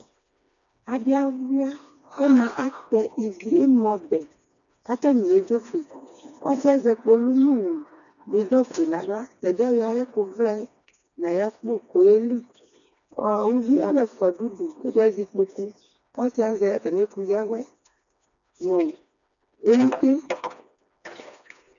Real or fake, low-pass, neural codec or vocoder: fake; 7.2 kHz; codec, 16 kHz, 2 kbps, FreqCodec, smaller model